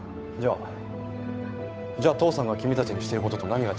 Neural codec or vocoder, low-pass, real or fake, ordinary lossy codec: codec, 16 kHz, 8 kbps, FunCodec, trained on Chinese and English, 25 frames a second; none; fake; none